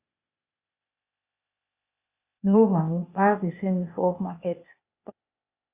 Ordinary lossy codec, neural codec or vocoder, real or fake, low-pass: Opus, 64 kbps; codec, 16 kHz, 0.8 kbps, ZipCodec; fake; 3.6 kHz